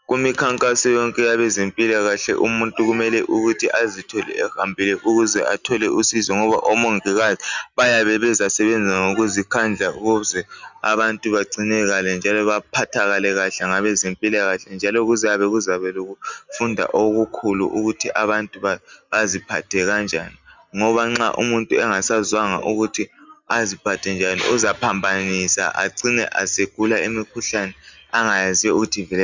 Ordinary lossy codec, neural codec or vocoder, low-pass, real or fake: Opus, 64 kbps; none; 7.2 kHz; real